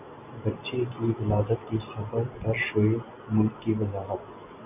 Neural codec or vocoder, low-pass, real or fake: none; 3.6 kHz; real